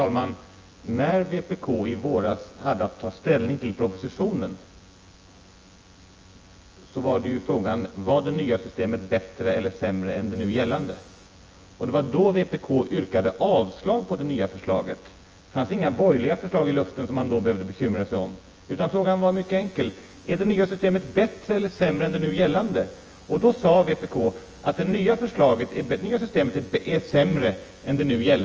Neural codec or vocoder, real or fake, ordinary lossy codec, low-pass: vocoder, 24 kHz, 100 mel bands, Vocos; fake; Opus, 24 kbps; 7.2 kHz